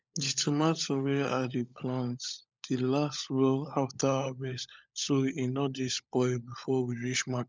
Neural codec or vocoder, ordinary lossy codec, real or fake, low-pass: codec, 16 kHz, 16 kbps, FunCodec, trained on LibriTTS, 50 frames a second; none; fake; none